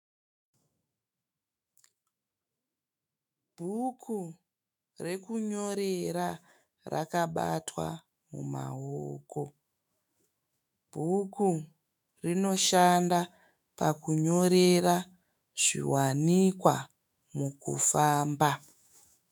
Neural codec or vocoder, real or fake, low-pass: autoencoder, 48 kHz, 128 numbers a frame, DAC-VAE, trained on Japanese speech; fake; 19.8 kHz